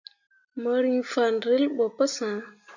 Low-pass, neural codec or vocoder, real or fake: 7.2 kHz; none; real